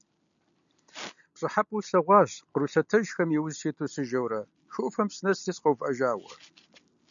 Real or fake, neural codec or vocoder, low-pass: real; none; 7.2 kHz